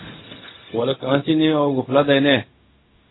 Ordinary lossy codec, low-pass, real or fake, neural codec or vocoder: AAC, 16 kbps; 7.2 kHz; fake; codec, 16 kHz, 0.4 kbps, LongCat-Audio-Codec